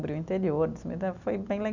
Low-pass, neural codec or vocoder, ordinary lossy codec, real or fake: 7.2 kHz; none; none; real